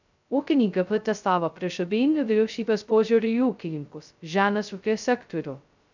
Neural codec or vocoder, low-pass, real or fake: codec, 16 kHz, 0.2 kbps, FocalCodec; 7.2 kHz; fake